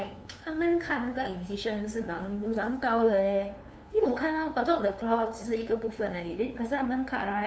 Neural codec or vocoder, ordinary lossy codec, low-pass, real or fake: codec, 16 kHz, 2 kbps, FunCodec, trained on LibriTTS, 25 frames a second; none; none; fake